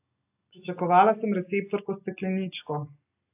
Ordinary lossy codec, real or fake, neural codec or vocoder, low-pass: AAC, 32 kbps; real; none; 3.6 kHz